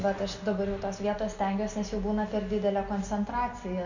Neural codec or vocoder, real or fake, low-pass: none; real; 7.2 kHz